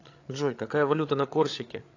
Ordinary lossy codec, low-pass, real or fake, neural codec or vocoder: AAC, 48 kbps; 7.2 kHz; fake; codec, 16 kHz, 8 kbps, FreqCodec, larger model